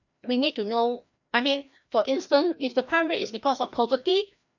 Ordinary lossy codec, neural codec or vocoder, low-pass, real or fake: none; codec, 16 kHz, 1 kbps, FreqCodec, larger model; 7.2 kHz; fake